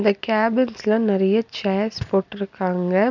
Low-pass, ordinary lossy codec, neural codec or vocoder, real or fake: 7.2 kHz; none; none; real